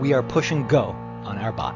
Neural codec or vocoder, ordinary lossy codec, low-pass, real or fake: none; AAC, 48 kbps; 7.2 kHz; real